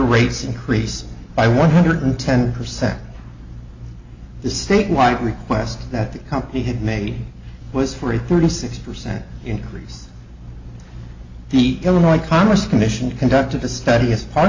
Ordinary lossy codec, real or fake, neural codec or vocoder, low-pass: AAC, 48 kbps; real; none; 7.2 kHz